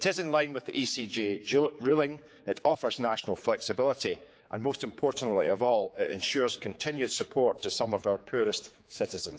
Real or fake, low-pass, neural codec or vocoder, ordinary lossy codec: fake; none; codec, 16 kHz, 4 kbps, X-Codec, HuBERT features, trained on general audio; none